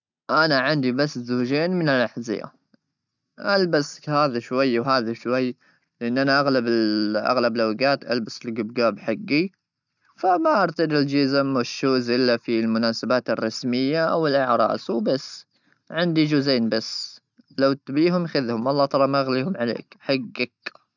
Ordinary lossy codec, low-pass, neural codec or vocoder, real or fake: none; 7.2 kHz; none; real